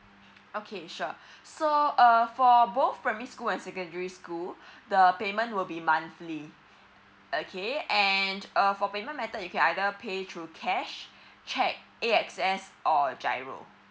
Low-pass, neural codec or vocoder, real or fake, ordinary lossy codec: none; none; real; none